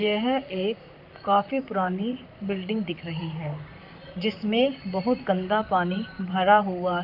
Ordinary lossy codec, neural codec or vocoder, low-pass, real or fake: none; vocoder, 44.1 kHz, 128 mel bands, Pupu-Vocoder; 5.4 kHz; fake